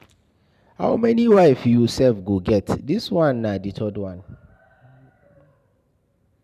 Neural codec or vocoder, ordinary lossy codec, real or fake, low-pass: vocoder, 44.1 kHz, 128 mel bands every 512 samples, BigVGAN v2; none; fake; 14.4 kHz